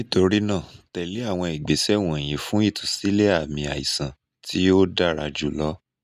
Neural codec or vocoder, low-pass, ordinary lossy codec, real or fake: none; 14.4 kHz; none; real